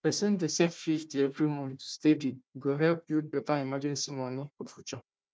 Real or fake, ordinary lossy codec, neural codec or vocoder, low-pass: fake; none; codec, 16 kHz, 1 kbps, FunCodec, trained on Chinese and English, 50 frames a second; none